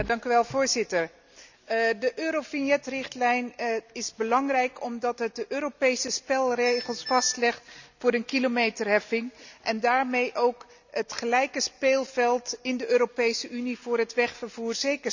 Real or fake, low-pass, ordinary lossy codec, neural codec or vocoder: real; 7.2 kHz; none; none